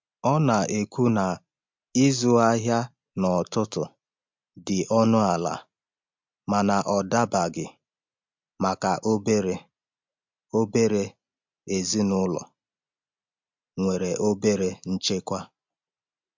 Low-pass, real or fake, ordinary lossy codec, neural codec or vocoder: 7.2 kHz; real; MP3, 64 kbps; none